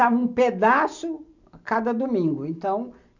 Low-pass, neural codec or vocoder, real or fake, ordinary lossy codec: 7.2 kHz; none; real; none